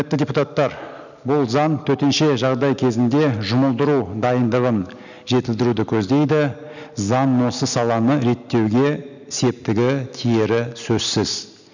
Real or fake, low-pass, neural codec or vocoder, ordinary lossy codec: real; 7.2 kHz; none; none